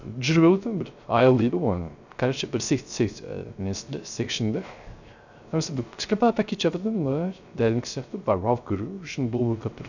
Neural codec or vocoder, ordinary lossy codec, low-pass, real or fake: codec, 16 kHz, 0.3 kbps, FocalCodec; none; 7.2 kHz; fake